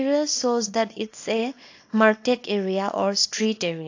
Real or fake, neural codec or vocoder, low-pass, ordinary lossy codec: fake; codec, 24 kHz, 0.9 kbps, WavTokenizer, small release; 7.2 kHz; AAC, 48 kbps